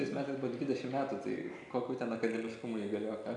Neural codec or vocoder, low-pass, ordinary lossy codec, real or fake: none; 10.8 kHz; AAC, 64 kbps; real